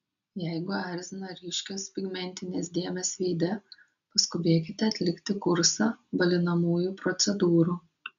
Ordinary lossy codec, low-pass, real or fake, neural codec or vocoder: MP3, 64 kbps; 7.2 kHz; real; none